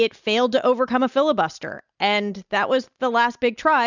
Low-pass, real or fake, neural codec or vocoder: 7.2 kHz; real; none